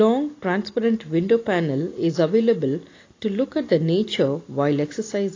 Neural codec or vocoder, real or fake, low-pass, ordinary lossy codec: none; real; 7.2 kHz; AAC, 32 kbps